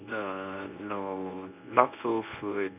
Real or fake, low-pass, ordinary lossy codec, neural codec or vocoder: fake; 3.6 kHz; none; codec, 24 kHz, 0.9 kbps, WavTokenizer, medium speech release version 1